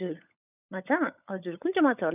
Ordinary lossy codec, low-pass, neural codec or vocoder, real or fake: none; 3.6 kHz; codec, 16 kHz, 16 kbps, FunCodec, trained on LibriTTS, 50 frames a second; fake